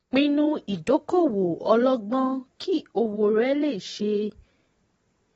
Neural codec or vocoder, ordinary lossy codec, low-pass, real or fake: vocoder, 22.05 kHz, 80 mel bands, WaveNeXt; AAC, 24 kbps; 9.9 kHz; fake